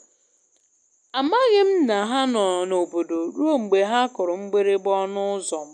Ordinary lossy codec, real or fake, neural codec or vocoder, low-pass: none; real; none; 9.9 kHz